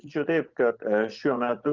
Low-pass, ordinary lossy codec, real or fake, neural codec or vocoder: 7.2 kHz; Opus, 24 kbps; fake; vocoder, 24 kHz, 100 mel bands, Vocos